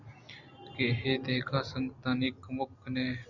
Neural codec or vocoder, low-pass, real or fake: none; 7.2 kHz; real